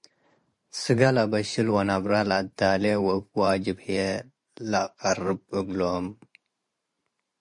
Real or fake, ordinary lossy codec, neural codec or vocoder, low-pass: fake; MP3, 48 kbps; vocoder, 44.1 kHz, 128 mel bands every 512 samples, BigVGAN v2; 10.8 kHz